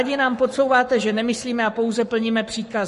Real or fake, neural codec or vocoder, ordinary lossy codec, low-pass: fake; codec, 44.1 kHz, 7.8 kbps, Pupu-Codec; MP3, 48 kbps; 14.4 kHz